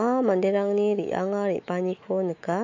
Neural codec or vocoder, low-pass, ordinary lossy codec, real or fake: autoencoder, 48 kHz, 128 numbers a frame, DAC-VAE, trained on Japanese speech; 7.2 kHz; AAC, 48 kbps; fake